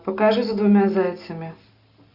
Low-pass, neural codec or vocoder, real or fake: 5.4 kHz; none; real